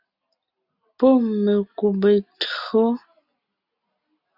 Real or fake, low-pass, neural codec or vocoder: real; 5.4 kHz; none